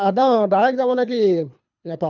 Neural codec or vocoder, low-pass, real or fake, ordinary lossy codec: codec, 24 kHz, 3 kbps, HILCodec; 7.2 kHz; fake; none